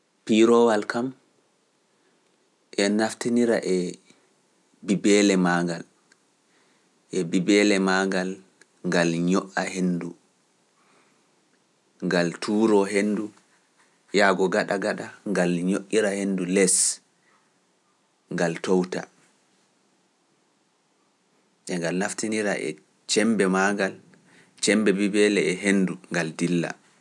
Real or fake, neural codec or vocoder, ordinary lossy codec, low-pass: real; none; none; none